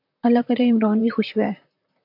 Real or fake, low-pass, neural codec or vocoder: fake; 5.4 kHz; vocoder, 44.1 kHz, 128 mel bands, Pupu-Vocoder